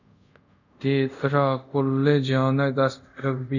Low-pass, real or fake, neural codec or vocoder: 7.2 kHz; fake; codec, 24 kHz, 0.5 kbps, DualCodec